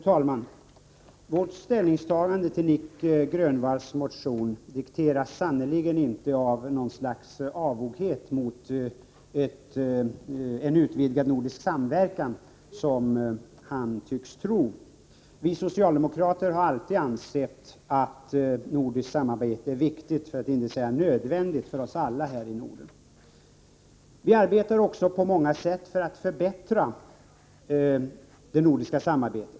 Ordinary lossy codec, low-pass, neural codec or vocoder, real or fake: none; none; none; real